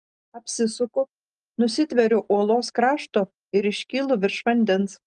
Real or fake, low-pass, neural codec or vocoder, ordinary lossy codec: real; 9.9 kHz; none; Opus, 24 kbps